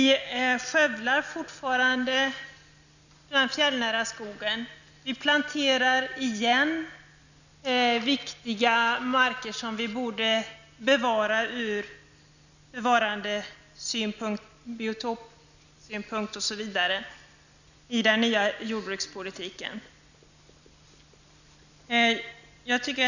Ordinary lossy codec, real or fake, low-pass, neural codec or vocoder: none; real; 7.2 kHz; none